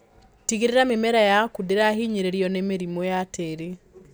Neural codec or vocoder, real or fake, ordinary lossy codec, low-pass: none; real; none; none